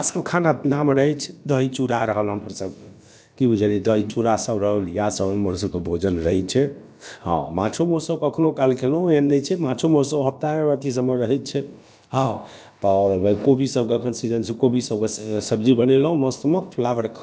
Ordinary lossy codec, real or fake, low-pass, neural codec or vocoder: none; fake; none; codec, 16 kHz, about 1 kbps, DyCAST, with the encoder's durations